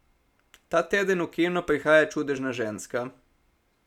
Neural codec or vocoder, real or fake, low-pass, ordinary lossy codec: none; real; 19.8 kHz; none